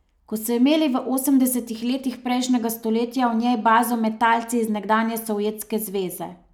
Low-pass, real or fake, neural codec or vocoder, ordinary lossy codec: 19.8 kHz; real; none; none